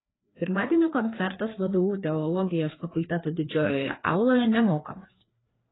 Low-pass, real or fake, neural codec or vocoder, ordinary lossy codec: 7.2 kHz; fake; codec, 16 kHz, 2 kbps, FreqCodec, larger model; AAC, 16 kbps